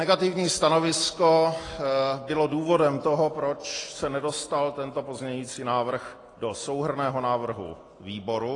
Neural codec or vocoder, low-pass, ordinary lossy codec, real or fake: none; 10.8 kHz; AAC, 32 kbps; real